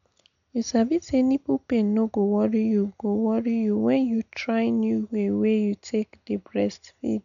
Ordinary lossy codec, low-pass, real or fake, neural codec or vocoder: none; 7.2 kHz; real; none